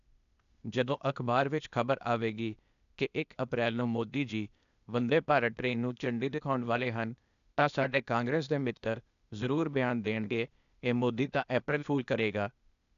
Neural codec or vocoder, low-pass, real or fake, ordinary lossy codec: codec, 16 kHz, 0.8 kbps, ZipCodec; 7.2 kHz; fake; none